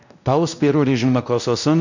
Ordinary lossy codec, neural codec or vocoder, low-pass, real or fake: none; codec, 16 kHz, 1 kbps, X-Codec, WavLM features, trained on Multilingual LibriSpeech; 7.2 kHz; fake